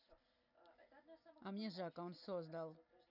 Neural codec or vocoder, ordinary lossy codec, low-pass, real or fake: none; none; 5.4 kHz; real